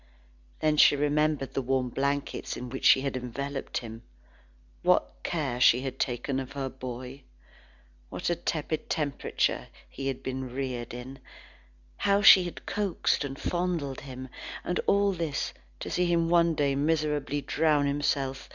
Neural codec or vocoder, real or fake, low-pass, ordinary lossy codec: none; real; 7.2 kHz; Opus, 64 kbps